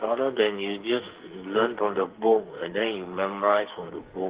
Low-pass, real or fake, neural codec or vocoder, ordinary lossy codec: 3.6 kHz; fake; codec, 44.1 kHz, 2.6 kbps, SNAC; Opus, 16 kbps